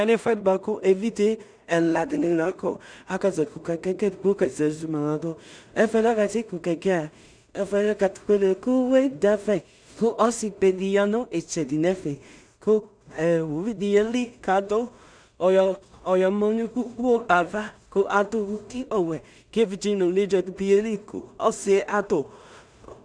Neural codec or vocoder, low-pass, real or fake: codec, 16 kHz in and 24 kHz out, 0.4 kbps, LongCat-Audio-Codec, two codebook decoder; 9.9 kHz; fake